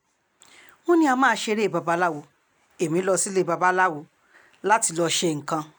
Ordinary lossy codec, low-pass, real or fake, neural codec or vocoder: none; none; real; none